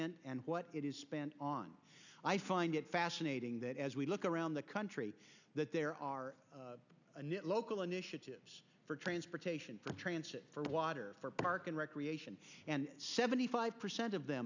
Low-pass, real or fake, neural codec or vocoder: 7.2 kHz; real; none